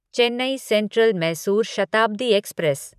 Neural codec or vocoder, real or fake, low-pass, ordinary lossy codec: vocoder, 44.1 kHz, 128 mel bands every 512 samples, BigVGAN v2; fake; 14.4 kHz; none